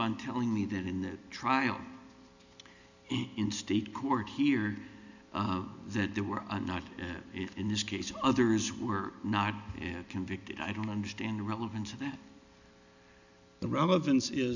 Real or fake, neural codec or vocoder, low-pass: real; none; 7.2 kHz